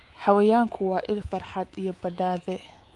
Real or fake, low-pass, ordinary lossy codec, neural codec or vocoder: fake; 10.8 kHz; Opus, 32 kbps; codec, 24 kHz, 3.1 kbps, DualCodec